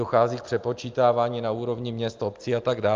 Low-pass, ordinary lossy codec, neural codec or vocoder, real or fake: 7.2 kHz; Opus, 24 kbps; none; real